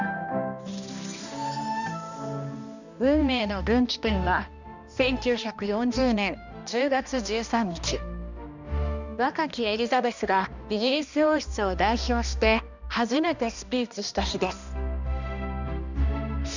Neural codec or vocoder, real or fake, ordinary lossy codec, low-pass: codec, 16 kHz, 1 kbps, X-Codec, HuBERT features, trained on balanced general audio; fake; none; 7.2 kHz